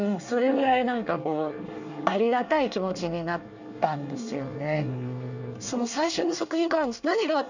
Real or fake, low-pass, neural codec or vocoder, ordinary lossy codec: fake; 7.2 kHz; codec, 24 kHz, 1 kbps, SNAC; none